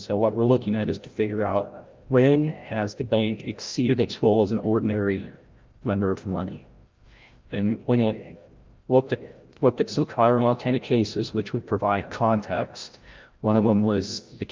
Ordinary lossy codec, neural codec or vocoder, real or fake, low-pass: Opus, 32 kbps; codec, 16 kHz, 0.5 kbps, FreqCodec, larger model; fake; 7.2 kHz